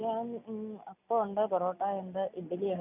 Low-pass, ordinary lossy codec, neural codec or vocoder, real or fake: 3.6 kHz; none; none; real